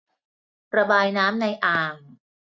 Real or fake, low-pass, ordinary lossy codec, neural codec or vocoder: real; none; none; none